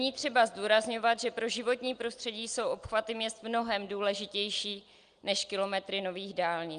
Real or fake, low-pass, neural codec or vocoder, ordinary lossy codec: real; 9.9 kHz; none; Opus, 32 kbps